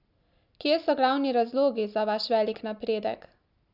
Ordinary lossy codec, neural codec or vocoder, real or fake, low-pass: none; none; real; 5.4 kHz